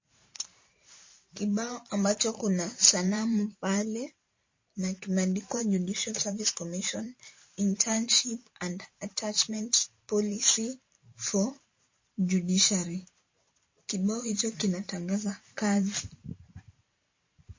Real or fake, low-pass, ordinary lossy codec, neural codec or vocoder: fake; 7.2 kHz; MP3, 32 kbps; vocoder, 44.1 kHz, 128 mel bands every 512 samples, BigVGAN v2